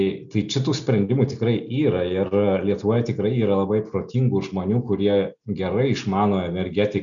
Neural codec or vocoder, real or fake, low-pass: none; real; 7.2 kHz